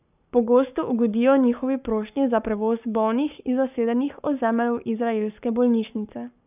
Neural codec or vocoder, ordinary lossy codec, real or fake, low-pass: codec, 44.1 kHz, 7.8 kbps, Pupu-Codec; none; fake; 3.6 kHz